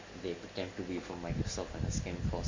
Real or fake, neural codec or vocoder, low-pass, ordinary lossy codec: real; none; 7.2 kHz; MP3, 48 kbps